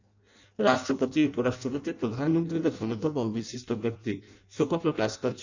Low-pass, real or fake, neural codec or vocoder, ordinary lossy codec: 7.2 kHz; fake; codec, 16 kHz in and 24 kHz out, 0.6 kbps, FireRedTTS-2 codec; none